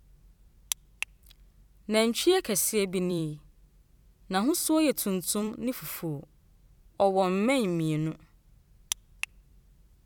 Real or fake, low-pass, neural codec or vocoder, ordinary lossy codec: fake; 19.8 kHz; vocoder, 44.1 kHz, 128 mel bands every 256 samples, BigVGAN v2; none